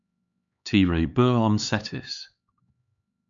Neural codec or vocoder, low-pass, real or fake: codec, 16 kHz, 4 kbps, X-Codec, HuBERT features, trained on LibriSpeech; 7.2 kHz; fake